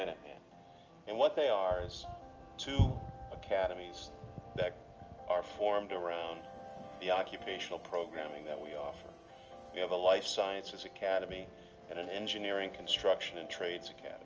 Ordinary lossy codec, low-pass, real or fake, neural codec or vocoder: Opus, 24 kbps; 7.2 kHz; real; none